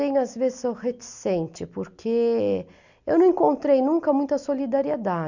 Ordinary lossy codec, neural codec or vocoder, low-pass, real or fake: none; none; 7.2 kHz; real